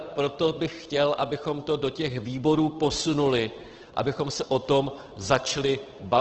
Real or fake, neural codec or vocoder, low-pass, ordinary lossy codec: fake; codec, 16 kHz, 8 kbps, FunCodec, trained on Chinese and English, 25 frames a second; 7.2 kHz; Opus, 16 kbps